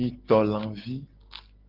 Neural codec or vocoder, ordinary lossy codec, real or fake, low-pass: none; Opus, 16 kbps; real; 5.4 kHz